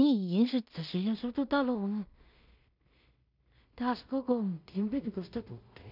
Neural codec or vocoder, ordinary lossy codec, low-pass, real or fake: codec, 16 kHz in and 24 kHz out, 0.4 kbps, LongCat-Audio-Codec, two codebook decoder; none; 5.4 kHz; fake